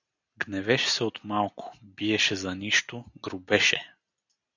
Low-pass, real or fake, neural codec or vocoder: 7.2 kHz; real; none